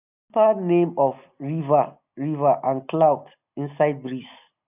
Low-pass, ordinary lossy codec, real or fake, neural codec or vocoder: 3.6 kHz; none; real; none